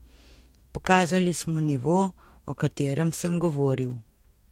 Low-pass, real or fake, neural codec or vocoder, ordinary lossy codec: 19.8 kHz; fake; codec, 44.1 kHz, 2.6 kbps, DAC; MP3, 64 kbps